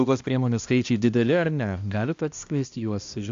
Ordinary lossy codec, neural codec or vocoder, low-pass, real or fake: AAC, 64 kbps; codec, 16 kHz, 1 kbps, X-Codec, HuBERT features, trained on balanced general audio; 7.2 kHz; fake